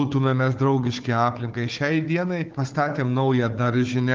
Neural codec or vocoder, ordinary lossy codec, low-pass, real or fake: codec, 16 kHz, 4 kbps, FunCodec, trained on Chinese and English, 50 frames a second; Opus, 24 kbps; 7.2 kHz; fake